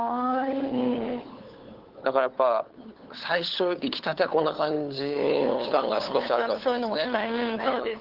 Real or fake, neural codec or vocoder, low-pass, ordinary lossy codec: fake; codec, 16 kHz, 8 kbps, FunCodec, trained on LibriTTS, 25 frames a second; 5.4 kHz; Opus, 16 kbps